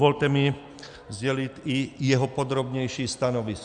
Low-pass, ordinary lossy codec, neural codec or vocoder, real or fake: 9.9 kHz; Opus, 64 kbps; none; real